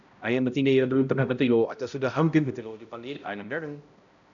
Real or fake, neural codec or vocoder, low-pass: fake; codec, 16 kHz, 0.5 kbps, X-Codec, HuBERT features, trained on balanced general audio; 7.2 kHz